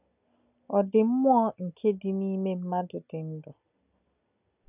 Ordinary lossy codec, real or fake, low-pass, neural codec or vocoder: none; real; 3.6 kHz; none